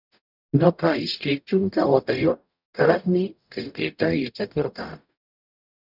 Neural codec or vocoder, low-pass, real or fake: codec, 44.1 kHz, 0.9 kbps, DAC; 5.4 kHz; fake